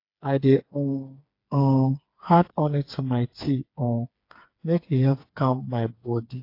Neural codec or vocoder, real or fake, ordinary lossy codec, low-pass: codec, 16 kHz, 4 kbps, FreqCodec, smaller model; fake; AAC, 32 kbps; 5.4 kHz